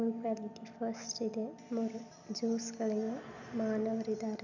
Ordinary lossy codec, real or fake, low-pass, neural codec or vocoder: none; real; 7.2 kHz; none